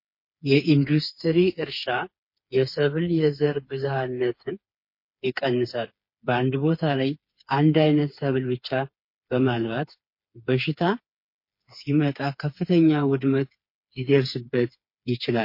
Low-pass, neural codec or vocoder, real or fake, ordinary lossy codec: 5.4 kHz; codec, 16 kHz, 4 kbps, FreqCodec, smaller model; fake; MP3, 32 kbps